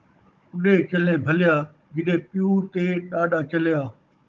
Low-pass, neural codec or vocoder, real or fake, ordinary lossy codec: 7.2 kHz; codec, 16 kHz, 16 kbps, FunCodec, trained on Chinese and English, 50 frames a second; fake; Opus, 24 kbps